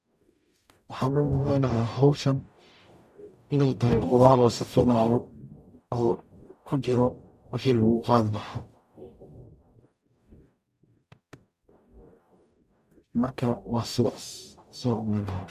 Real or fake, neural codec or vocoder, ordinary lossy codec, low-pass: fake; codec, 44.1 kHz, 0.9 kbps, DAC; none; 14.4 kHz